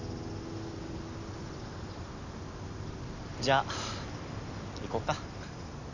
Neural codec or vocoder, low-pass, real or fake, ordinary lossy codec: none; 7.2 kHz; real; none